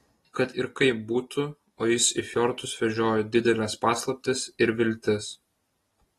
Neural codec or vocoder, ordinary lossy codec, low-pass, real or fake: none; AAC, 32 kbps; 19.8 kHz; real